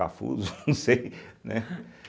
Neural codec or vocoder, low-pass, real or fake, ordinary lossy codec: none; none; real; none